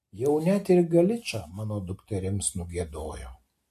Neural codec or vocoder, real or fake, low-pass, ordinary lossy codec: none; real; 14.4 kHz; MP3, 64 kbps